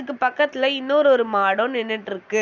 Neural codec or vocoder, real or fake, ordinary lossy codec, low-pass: none; real; none; 7.2 kHz